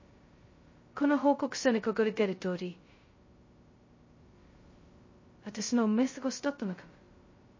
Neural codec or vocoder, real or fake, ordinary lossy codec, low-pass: codec, 16 kHz, 0.2 kbps, FocalCodec; fake; MP3, 32 kbps; 7.2 kHz